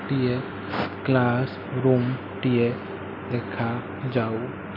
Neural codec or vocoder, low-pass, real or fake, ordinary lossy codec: none; 5.4 kHz; real; MP3, 48 kbps